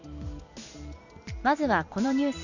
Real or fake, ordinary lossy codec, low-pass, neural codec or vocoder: real; none; 7.2 kHz; none